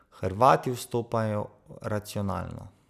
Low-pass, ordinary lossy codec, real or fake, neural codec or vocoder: 14.4 kHz; none; real; none